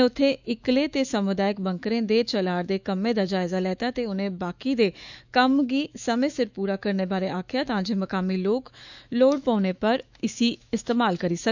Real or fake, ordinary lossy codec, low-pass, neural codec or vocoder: fake; none; 7.2 kHz; autoencoder, 48 kHz, 128 numbers a frame, DAC-VAE, trained on Japanese speech